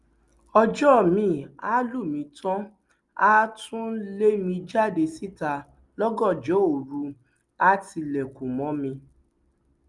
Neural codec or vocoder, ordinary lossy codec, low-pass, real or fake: none; Opus, 32 kbps; 10.8 kHz; real